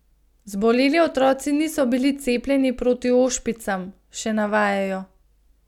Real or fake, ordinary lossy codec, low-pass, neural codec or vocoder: real; none; 19.8 kHz; none